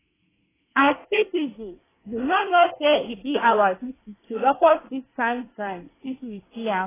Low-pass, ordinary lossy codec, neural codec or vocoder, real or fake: 3.6 kHz; AAC, 16 kbps; codec, 24 kHz, 1 kbps, SNAC; fake